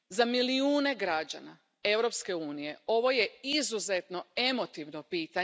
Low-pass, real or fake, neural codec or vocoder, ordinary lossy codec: none; real; none; none